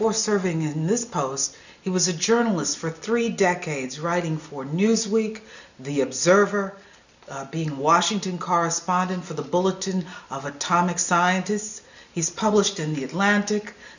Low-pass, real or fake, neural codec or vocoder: 7.2 kHz; real; none